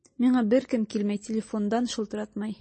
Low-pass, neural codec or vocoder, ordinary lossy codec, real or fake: 10.8 kHz; none; MP3, 32 kbps; real